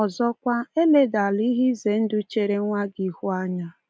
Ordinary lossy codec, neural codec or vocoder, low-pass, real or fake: none; none; none; real